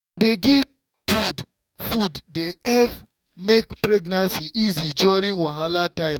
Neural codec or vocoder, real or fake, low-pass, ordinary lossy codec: codec, 44.1 kHz, 2.6 kbps, DAC; fake; 19.8 kHz; none